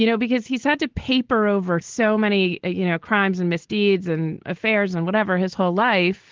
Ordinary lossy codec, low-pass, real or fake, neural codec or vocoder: Opus, 16 kbps; 7.2 kHz; real; none